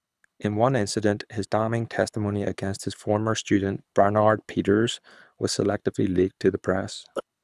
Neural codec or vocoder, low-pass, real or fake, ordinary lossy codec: codec, 24 kHz, 6 kbps, HILCodec; none; fake; none